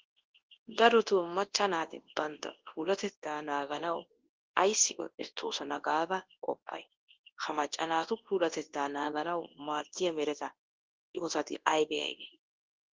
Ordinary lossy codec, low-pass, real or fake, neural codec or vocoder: Opus, 16 kbps; 7.2 kHz; fake; codec, 24 kHz, 0.9 kbps, WavTokenizer, large speech release